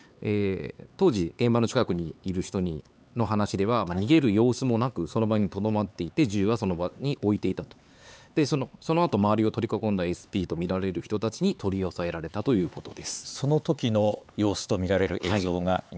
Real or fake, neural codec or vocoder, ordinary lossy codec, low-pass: fake; codec, 16 kHz, 4 kbps, X-Codec, HuBERT features, trained on LibriSpeech; none; none